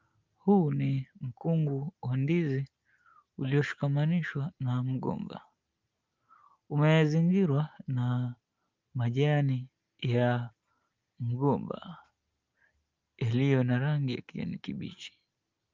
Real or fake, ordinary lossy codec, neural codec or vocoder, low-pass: real; Opus, 24 kbps; none; 7.2 kHz